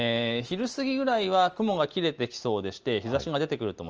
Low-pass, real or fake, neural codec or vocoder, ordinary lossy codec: 7.2 kHz; real; none; Opus, 24 kbps